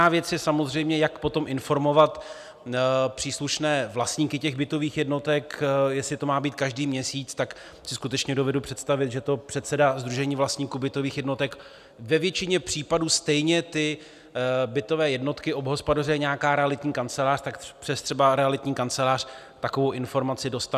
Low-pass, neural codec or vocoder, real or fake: 14.4 kHz; none; real